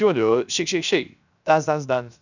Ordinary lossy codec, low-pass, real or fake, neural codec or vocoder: none; 7.2 kHz; fake; codec, 16 kHz, 0.7 kbps, FocalCodec